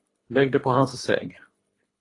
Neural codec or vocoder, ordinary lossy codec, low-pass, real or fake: codec, 24 kHz, 3 kbps, HILCodec; AAC, 32 kbps; 10.8 kHz; fake